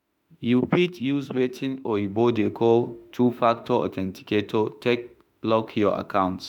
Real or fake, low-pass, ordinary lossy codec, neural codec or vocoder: fake; 19.8 kHz; none; autoencoder, 48 kHz, 32 numbers a frame, DAC-VAE, trained on Japanese speech